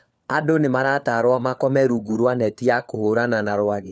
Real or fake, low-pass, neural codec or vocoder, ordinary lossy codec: fake; none; codec, 16 kHz, 4 kbps, FunCodec, trained on LibriTTS, 50 frames a second; none